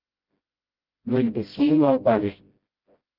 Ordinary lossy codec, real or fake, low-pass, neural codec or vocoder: Opus, 32 kbps; fake; 5.4 kHz; codec, 16 kHz, 0.5 kbps, FreqCodec, smaller model